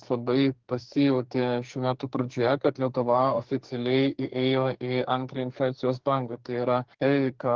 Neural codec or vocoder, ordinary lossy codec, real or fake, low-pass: codec, 44.1 kHz, 2.6 kbps, SNAC; Opus, 16 kbps; fake; 7.2 kHz